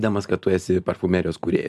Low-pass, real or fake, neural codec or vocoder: 14.4 kHz; real; none